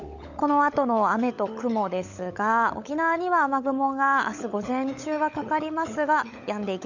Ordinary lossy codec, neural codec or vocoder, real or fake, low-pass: none; codec, 16 kHz, 16 kbps, FunCodec, trained on LibriTTS, 50 frames a second; fake; 7.2 kHz